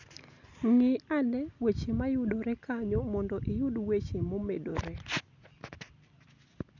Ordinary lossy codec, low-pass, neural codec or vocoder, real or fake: none; 7.2 kHz; none; real